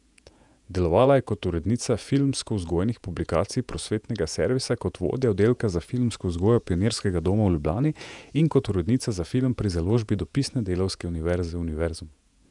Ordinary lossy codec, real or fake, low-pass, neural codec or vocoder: none; fake; 10.8 kHz; vocoder, 48 kHz, 128 mel bands, Vocos